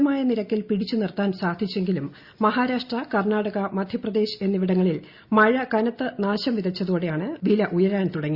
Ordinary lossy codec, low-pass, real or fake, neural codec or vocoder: Opus, 64 kbps; 5.4 kHz; real; none